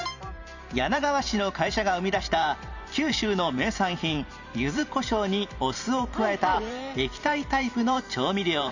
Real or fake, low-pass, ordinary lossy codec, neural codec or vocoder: fake; 7.2 kHz; none; vocoder, 44.1 kHz, 128 mel bands every 512 samples, BigVGAN v2